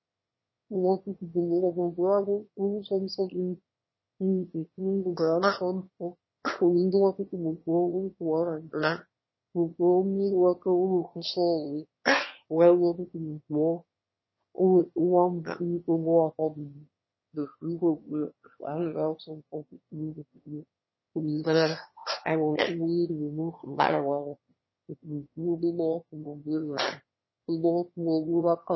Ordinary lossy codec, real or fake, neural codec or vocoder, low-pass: MP3, 24 kbps; fake; autoencoder, 22.05 kHz, a latent of 192 numbers a frame, VITS, trained on one speaker; 7.2 kHz